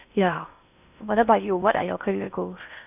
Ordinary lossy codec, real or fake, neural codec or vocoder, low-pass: none; fake; codec, 16 kHz in and 24 kHz out, 0.6 kbps, FocalCodec, streaming, 4096 codes; 3.6 kHz